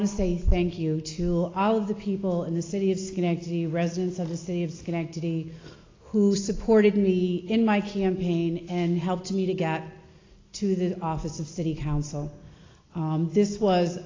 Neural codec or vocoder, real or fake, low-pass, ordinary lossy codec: none; real; 7.2 kHz; AAC, 32 kbps